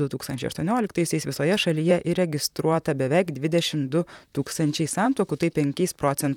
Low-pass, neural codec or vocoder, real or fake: 19.8 kHz; vocoder, 44.1 kHz, 128 mel bands, Pupu-Vocoder; fake